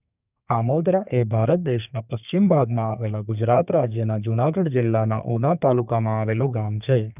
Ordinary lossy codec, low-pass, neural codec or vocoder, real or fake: none; 3.6 kHz; codec, 32 kHz, 1.9 kbps, SNAC; fake